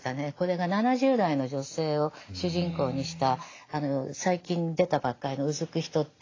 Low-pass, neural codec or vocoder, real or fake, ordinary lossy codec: 7.2 kHz; none; real; AAC, 32 kbps